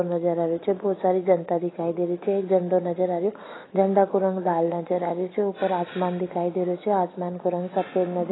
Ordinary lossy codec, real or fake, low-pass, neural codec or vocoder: AAC, 16 kbps; real; 7.2 kHz; none